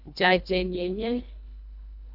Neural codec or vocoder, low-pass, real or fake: codec, 24 kHz, 1.5 kbps, HILCodec; 5.4 kHz; fake